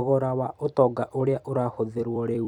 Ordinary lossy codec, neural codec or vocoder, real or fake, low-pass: none; vocoder, 44.1 kHz, 128 mel bands every 256 samples, BigVGAN v2; fake; 19.8 kHz